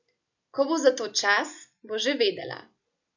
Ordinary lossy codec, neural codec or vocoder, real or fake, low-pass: none; none; real; 7.2 kHz